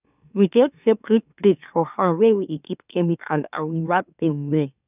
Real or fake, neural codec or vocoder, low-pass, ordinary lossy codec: fake; autoencoder, 44.1 kHz, a latent of 192 numbers a frame, MeloTTS; 3.6 kHz; none